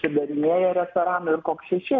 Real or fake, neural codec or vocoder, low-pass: real; none; 7.2 kHz